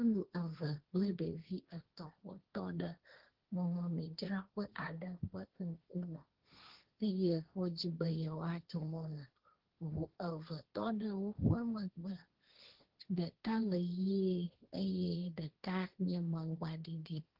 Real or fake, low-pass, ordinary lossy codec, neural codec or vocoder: fake; 5.4 kHz; Opus, 16 kbps; codec, 16 kHz, 1.1 kbps, Voila-Tokenizer